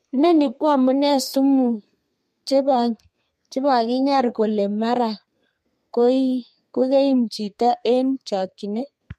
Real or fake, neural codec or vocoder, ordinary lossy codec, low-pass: fake; codec, 32 kHz, 1.9 kbps, SNAC; MP3, 64 kbps; 14.4 kHz